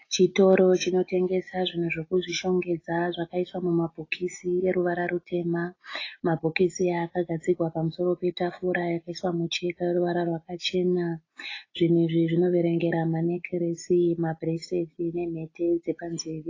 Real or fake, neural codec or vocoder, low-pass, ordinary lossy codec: real; none; 7.2 kHz; AAC, 32 kbps